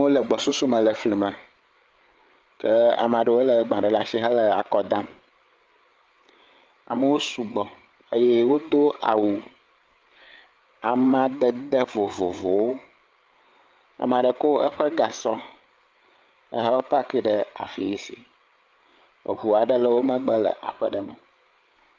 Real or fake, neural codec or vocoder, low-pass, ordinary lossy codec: fake; codec, 16 kHz, 8 kbps, FreqCodec, larger model; 7.2 kHz; Opus, 24 kbps